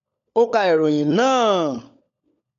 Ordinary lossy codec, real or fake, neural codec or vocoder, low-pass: none; fake; codec, 16 kHz, 16 kbps, FunCodec, trained on LibriTTS, 50 frames a second; 7.2 kHz